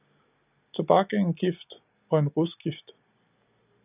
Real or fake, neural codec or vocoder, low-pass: real; none; 3.6 kHz